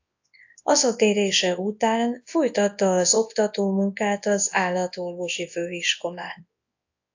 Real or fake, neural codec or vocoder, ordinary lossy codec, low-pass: fake; codec, 24 kHz, 0.9 kbps, WavTokenizer, large speech release; AAC, 48 kbps; 7.2 kHz